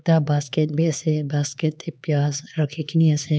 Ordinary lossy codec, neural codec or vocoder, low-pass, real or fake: none; codec, 16 kHz, 4 kbps, X-Codec, HuBERT features, trained on LibriSpeech; none; fake